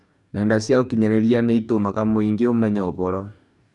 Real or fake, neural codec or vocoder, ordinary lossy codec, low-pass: fake; codec, 44.1 kHz, 2.6 kbps, DAC; none; 10.8 kHz